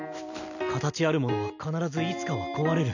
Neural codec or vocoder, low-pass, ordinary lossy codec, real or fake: none; 7.2 kHz; none; real